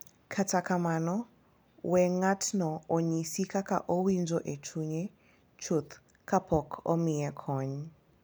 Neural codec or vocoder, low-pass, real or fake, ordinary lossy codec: none; none; real; none